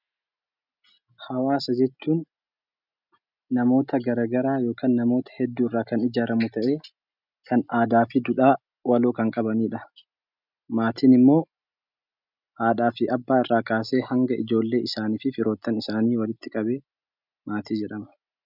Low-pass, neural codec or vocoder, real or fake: 5.4 kHz; none; real